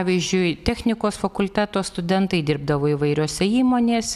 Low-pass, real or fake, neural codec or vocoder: 14.4 kHz; real; none